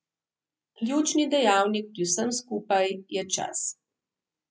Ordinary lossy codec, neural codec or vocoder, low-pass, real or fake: none; none; none; real